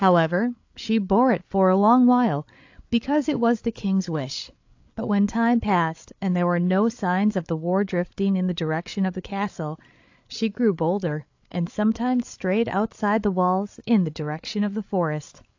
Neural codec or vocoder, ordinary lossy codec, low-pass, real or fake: codec, 16 kHz, 8 kbps, FreqCodec, larger model; AAC, 48 kbps; 7.2 kHz; fake